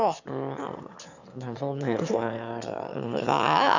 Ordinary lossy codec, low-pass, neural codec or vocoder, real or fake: none; 7.2 kHz; autoencoder, 22.05 kHz, a latent of 192 numbers a frame, VITS, trained on one speaker; fake